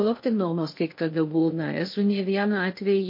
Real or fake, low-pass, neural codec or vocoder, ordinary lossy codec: fake; 5.4 kHz; codec, 16 kHz in and 24 kHz out, 0.8 kbps, FocalCodec, streaming, 65536 codes; MP3, 32 kbps